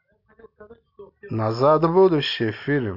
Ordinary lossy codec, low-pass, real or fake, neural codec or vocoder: none; 5.4 kHz; real; none